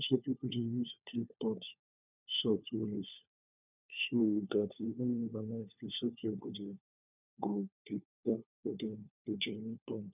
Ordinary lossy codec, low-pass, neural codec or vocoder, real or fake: none; 3.6 kHz; codec, 16 kHz, 4 kbps, FunCodec, trained on LibriTTS, 50 frames a second; fake